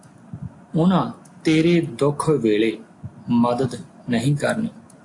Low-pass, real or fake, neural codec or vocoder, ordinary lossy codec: 10.8 kHz; real; none; AAC, 48 kbps